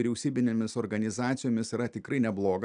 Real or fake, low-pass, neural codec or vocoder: real; 9.9 kHz; none